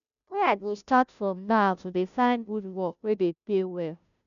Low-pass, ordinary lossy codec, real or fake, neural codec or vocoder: 7.2 kHz; none; fake; codec, 16 kHz, 0.5 kbps, FunCodec, trained on Chinese and English, 25 frames a second